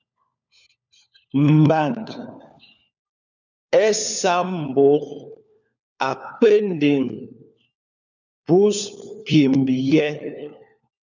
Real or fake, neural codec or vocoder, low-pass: fake; codec, 16 kHz, 4 kbps, FunCodec, trained on LibriTTS, 50 frames a second; 7.2 kHz